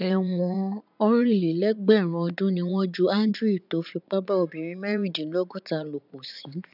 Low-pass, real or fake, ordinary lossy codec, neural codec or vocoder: 5.4 kHz; fake; none; vocoder, 44.1 kHz, 80 mel bands, Vocos